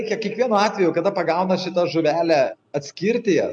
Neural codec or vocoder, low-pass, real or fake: none; 9.9 kHz; real